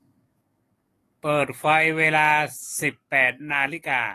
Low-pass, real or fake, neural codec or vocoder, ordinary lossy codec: 14.4 kHz; fake; codec, 44.1 kHz, 7.8 kbps, DAC; AAC, 48 kbps